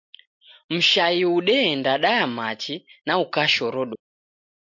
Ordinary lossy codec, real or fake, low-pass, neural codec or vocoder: MP3, 48 kbps; real; 7.2 kHz; none